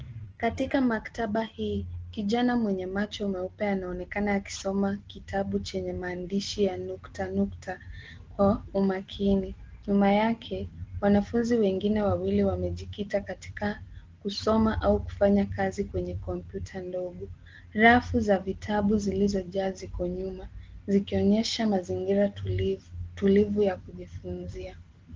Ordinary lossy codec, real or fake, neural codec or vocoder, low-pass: Opus, 16 kbps; real; none; 7.2 kHz